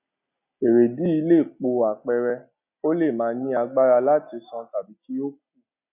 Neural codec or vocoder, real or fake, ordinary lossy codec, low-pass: none; real; none; 3.6 kHz